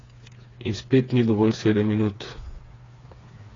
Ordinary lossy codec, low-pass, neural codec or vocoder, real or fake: AAC, 32 kbps; 7.2 kHz; codec, 16 kHz, 4 kbps, FreqCodec, smaller model; fake